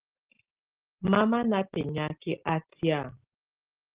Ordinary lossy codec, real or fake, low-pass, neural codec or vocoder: Opus, 16 kbps; real; 3.6 kHz; none